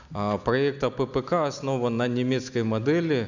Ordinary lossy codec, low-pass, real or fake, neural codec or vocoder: none; 7.2 kHz; real; none